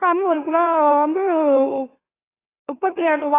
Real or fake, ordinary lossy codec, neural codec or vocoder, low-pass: fake; AAC, 16 kbps; autoencoder, 44.1 kHz, a latent of 192 numbers a frame, MeloTTS; 3.6 kHz